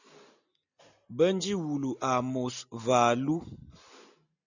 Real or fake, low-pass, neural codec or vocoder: real; 7.2 kHz; none